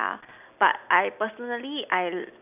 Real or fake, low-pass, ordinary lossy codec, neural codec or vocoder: real; 3.6 kHz; none; none